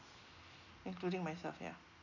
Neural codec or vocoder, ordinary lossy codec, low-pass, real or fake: none; none; 7.2 kHz; real